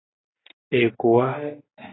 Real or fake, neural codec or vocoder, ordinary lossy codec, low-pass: real; none; AAC, 16 kbps; 7.2 kHz